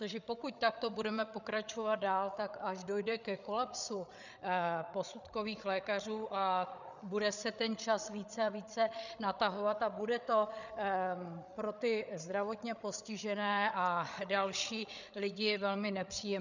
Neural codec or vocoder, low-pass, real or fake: codec, 16 kHz, 8 kbps, FreqCodec, larger model; 7.2 kHz; fake